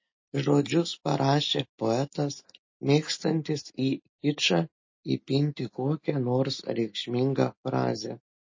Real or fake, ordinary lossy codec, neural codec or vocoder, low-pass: real; MP3, 32 kbps; none; 7.2 kHz